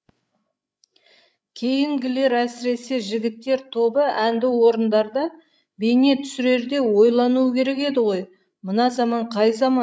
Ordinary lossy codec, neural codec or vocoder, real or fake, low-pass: none; codec, 16 kHz, 16 kbps, FreqCodec, larger model; fake; none